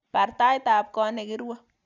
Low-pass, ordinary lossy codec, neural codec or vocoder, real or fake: 7.2 kHz; none; none; real